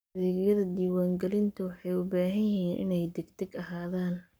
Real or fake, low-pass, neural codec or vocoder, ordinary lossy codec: fake; none; codec, 44.1 kHz, 7.8 kbps, Pupu-Codec; none